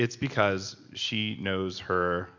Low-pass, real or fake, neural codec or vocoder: 7.2 kHz; real; none